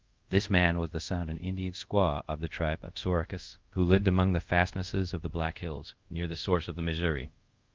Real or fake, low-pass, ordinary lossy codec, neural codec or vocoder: fake; 7.2 kHz; Opus, 24 kbps; codec, 24 kHz, 0.5 kbps, DualCodec